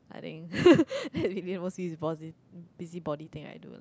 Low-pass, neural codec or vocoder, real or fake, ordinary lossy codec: none; none; real; none